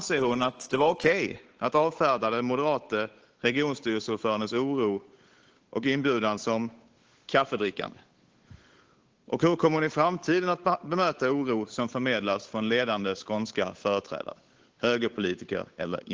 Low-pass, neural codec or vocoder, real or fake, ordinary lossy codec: 7.2 kHz; codec, 16 kHz, 8 kbps, FunCodec, trained on Chinese and English, 25 frames a second; fake; Opus, 16 kbps